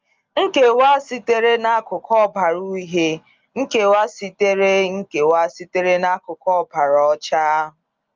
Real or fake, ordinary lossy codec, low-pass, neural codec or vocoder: real; Opus, 24 kbps; 7.2 kHz; none